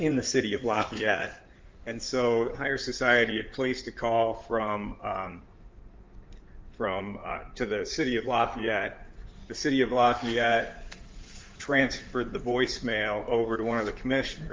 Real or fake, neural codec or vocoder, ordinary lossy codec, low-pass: fake; codec, 16 kHz in and 24 kHz out, 2.2 kbps, FireRedTTS-2 codec; Opus, 24 kbps; 7.2 kHz